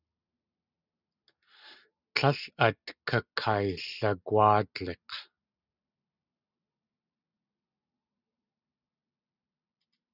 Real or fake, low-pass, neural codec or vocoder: real; 5.4 kHz; none